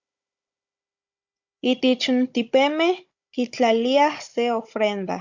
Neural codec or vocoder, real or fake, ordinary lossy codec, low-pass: codec, 16 kHz, 16 kbps, FunCodec, trained on Chinese and English, 50 frames a second; fake; Opus, 64 kbps; 7.2 kHz